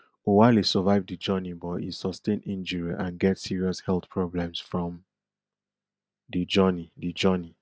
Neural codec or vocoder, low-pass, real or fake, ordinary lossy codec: none; none; real; none